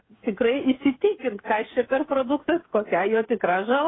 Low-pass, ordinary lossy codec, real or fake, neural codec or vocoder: 7.2 kHz; AAC, 16 kbps; fake; codec, 16 kHz, 8 kbps, FreqCodec, smaller model